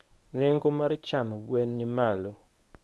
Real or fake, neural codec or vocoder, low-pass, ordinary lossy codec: fake; codec, 24 kHz, 0.9 kbps, WavTokenizer, medium speech release version 1; none; none